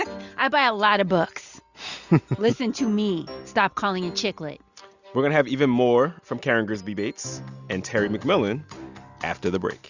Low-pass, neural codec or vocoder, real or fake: 7.2 kHz; none; real